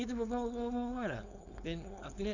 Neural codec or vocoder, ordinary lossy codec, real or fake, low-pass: codec, 16 kHz, 4.8 kbps, FACodec; none; fake; 7.2 kHz